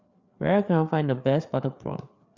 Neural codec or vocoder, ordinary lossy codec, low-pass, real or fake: codec, 16 kHz, 4 kbps, FreqCodec, larger model; Opus, 64 kbps; 7.2 kHz; fake